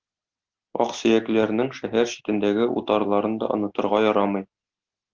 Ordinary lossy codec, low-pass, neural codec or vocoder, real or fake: Opus, 16 kbps; 7.2 kHz; none; real